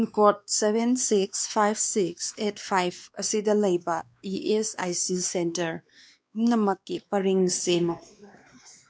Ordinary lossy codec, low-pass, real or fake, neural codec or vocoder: none; none; fake; codec, 16 kHz, 2 kbps, X-Codec, WavLM features, trained on Multilingual LibriSpeech